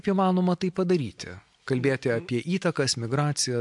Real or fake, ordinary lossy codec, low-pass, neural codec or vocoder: real; MP3, 64 kbps; 10.8 kHz; none